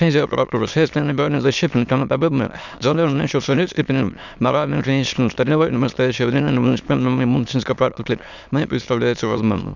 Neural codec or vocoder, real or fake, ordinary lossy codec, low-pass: autoencoder, 22.05 kHz, a latent of 192 numbers a frame, VITS, trained on many speakers; fake; none; 7.2 kHz